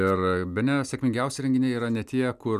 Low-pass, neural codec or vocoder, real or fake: 14.4 kHz; none; real